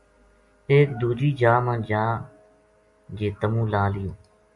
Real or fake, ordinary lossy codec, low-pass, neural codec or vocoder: real; MP3, 64 kbps; 10.8 kHz; none